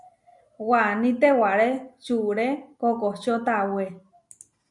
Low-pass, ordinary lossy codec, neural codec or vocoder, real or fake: 10.8 kHz; MP3, 96 kbps; none; real